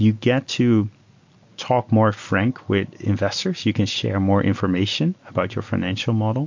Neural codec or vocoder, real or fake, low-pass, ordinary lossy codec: none; real; 7.2 kHz; MP3, 48 kbps